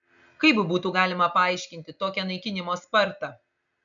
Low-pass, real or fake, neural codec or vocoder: 7.2 kHz; real; none